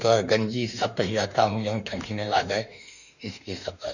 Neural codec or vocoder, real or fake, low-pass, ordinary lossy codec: autoencoder, 48 kHz, 32 numbers a frame, DAC-VAE, trained on Japanese speech; fake; 7.2 kHz; none